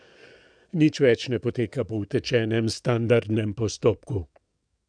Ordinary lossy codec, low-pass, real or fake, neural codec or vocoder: none; 9.9 kHz; fake; codec, 44.1 kHz, 7.8 kbps, DAC